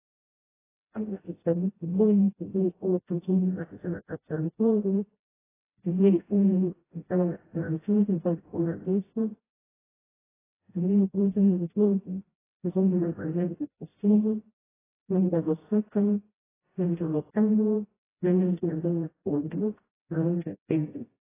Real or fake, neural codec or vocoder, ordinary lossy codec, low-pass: fake; codec, 16 kHz, 0.5 kbps, FreqCodec, smaller model; AAC, 16 kbps; 3.6 kHz